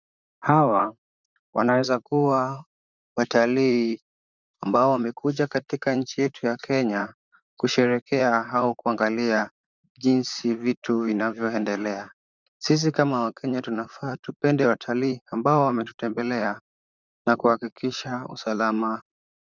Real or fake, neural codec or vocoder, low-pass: fake; vocoder, 44.1 kHz, 128 mel bands, Pupu-Vocoder; 7.2 kHz